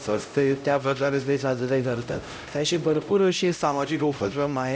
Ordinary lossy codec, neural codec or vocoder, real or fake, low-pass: none; codec, 16 kHz, 0.5 kbps, X-Codec, HuBERT features, trained on LibriSpeech; fake; none